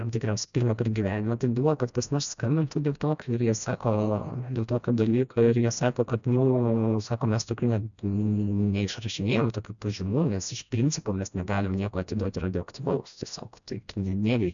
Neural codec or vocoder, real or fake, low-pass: codec, 16 kHz, 1 kbps, FreqCodec, smaller model; fake; 7.2 kHz